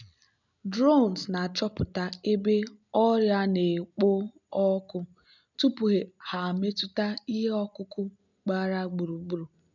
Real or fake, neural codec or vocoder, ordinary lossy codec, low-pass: real; none; none; 7.2 kHz